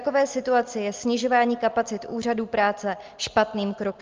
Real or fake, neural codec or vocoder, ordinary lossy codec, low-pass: real; none; Opus, 32 kbps; 7.2 kHz